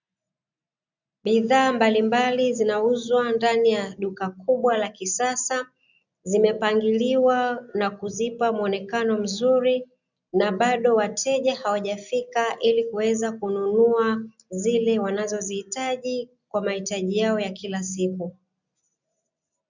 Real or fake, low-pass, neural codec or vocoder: real; 7.2 kHz; none